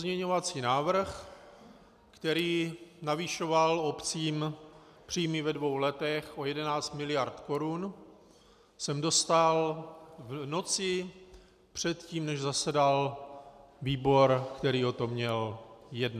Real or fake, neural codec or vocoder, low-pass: real; none; 14.4 kHz